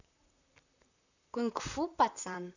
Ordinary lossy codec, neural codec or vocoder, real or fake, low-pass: none; vocoder, 44.1 kHz, 128 mel bands, Pupu-Vocoder; fake; 7.2 kHz